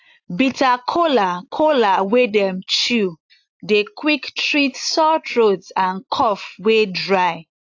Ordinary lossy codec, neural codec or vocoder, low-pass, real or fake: AAC, 48 kbps; none; 7.2 kHz; real